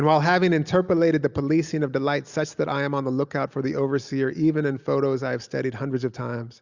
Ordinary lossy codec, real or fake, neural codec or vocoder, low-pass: Opus, 64 kbps; real; none; 7.2 kHz